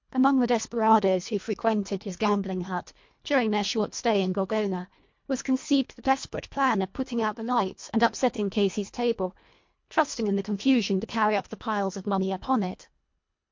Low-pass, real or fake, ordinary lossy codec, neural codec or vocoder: 7.2 kHz; fake; MP3, 48 kbps; codec, 24 kHz, 1.5 kbps, HILCodec